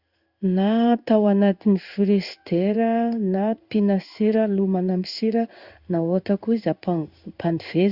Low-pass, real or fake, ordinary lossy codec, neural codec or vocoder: 5.4 kHz; fake; Opus, 64 kbps; codec, 16 kHz in and 24 kHz out, 1 kbps, XY-Tokenizer